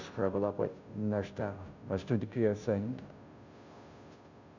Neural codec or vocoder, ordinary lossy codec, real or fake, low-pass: codec, 16 kHz, 0.5 kbps, FunCodec, trained on Chinese and English, 25 frames a second; none; fake; 7.2 kHz